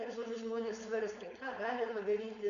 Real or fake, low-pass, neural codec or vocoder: fake; 7.2 kHz; codec, 16 kHz, 4.8 kbps, FACodec